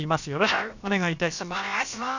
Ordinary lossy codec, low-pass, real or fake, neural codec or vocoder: none; 7.2 kHz; fake; codec, 16 kHz, about 1 kbps, DyCAST, with the encoder's durations